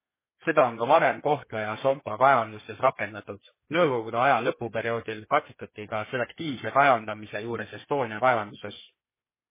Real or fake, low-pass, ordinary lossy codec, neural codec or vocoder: fake; 3.6 kHz; MP3, 16 kbps; codec, 32 kHz, 1.9 kbps, SNAC